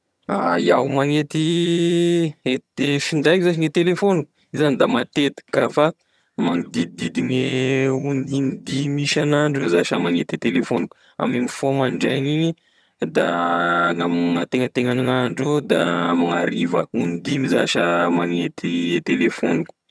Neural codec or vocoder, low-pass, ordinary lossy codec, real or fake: vocoder, 22.05 kHz, 80 mel bands, HiFi-GAN; none; none; fake